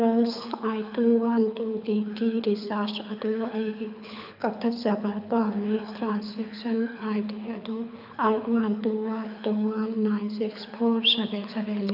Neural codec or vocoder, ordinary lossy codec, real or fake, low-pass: codec, 24 kHz, 6 kbps, HILCodec; none; fake; 5.4 kHz